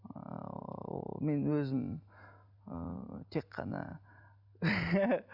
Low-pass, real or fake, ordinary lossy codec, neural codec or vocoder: 5.4 kHz; real; none; none